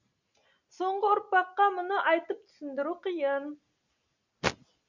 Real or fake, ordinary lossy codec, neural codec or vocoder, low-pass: real; none; none; 7.2 kHz